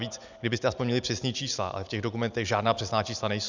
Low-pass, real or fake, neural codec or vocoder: 7.2 kHz; real; none